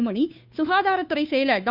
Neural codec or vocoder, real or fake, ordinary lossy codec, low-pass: codec, 16 kHz, 2 kbps, FunCodec, trained on Chinese and English, 25 frames a second; fake; none; 5.4 kHz